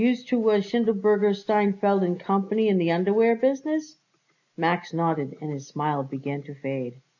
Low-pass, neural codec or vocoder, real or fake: 7.2 kHz; none; real